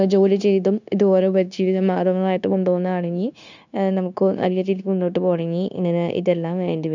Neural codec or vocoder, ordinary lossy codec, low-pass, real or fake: codec, 16 kHz, 0.9 kbps, LongCat-Audio-Codec; none; 7.2 kHz; fake